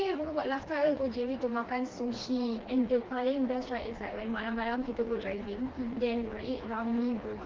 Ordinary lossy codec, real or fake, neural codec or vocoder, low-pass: Opus, 16 kbps; fake; codec, 16 kHz, 2 kbps, FreqCodec, smaller model; 7.2 kHz